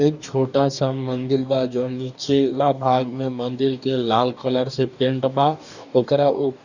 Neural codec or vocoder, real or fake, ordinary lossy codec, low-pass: codec, 44.1 kHz, 2.6 kbps, DAC; fake; none; 7.2 kHz